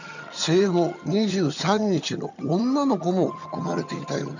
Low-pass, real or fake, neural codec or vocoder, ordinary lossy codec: 7.2 kHz; fake; vocoder, 22.05 kHz, 80 mel bands, HiFi-GAN; none